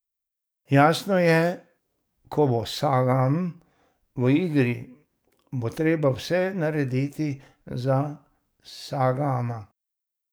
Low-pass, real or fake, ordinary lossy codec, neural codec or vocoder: none; fake; none; codec, 44.1 kHz, 7.8 kbps, DAC